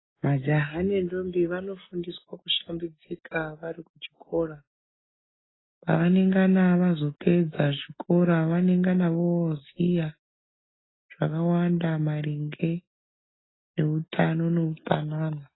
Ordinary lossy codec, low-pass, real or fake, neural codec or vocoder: AAC, 16 kbps; 7.2 kHz; real; none